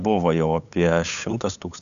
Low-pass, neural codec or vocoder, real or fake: 7.2 kHz; codec, 16 kHz, 8 kbps, FunCodec, trained on LibriTTS, 25 frames a second; fake